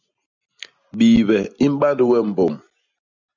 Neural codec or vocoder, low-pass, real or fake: none; 7.2 kHz; real